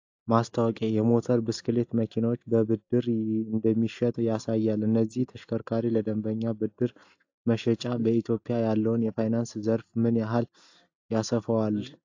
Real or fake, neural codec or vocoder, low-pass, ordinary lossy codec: real; none; 7.2 kHz; AAC, 48 kbps